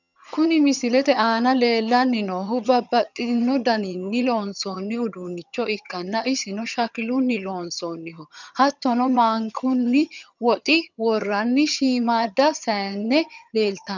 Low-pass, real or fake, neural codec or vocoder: 7.2 kHz; fake; vocoder, 22.05 kHz, 80 mel bands, HiFi-GAN